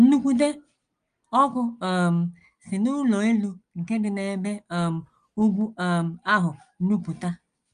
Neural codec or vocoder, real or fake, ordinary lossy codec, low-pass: none; real; Opus, 32 kbps; 9.9 kHz